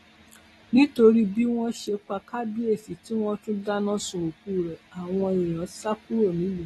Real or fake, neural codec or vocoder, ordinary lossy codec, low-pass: real; none; AAC, 32 kbps; 19.8 kHz